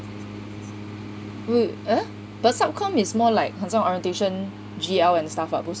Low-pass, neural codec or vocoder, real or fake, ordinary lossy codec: none; none; real; none